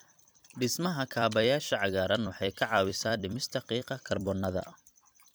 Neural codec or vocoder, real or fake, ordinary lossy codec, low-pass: vocoder, 44.1 kHz, 128 mel bands every 256 samples, BigVGAN v2; fake; none; none